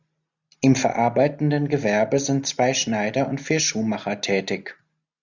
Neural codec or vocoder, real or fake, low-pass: none; real; 7.2 kHz